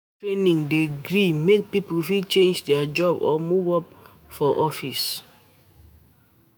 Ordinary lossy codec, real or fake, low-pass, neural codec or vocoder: none; fake; none; autoencoder, 48 kHz, 128 numbers a frame, DAC-VAE, trained on Japanese speech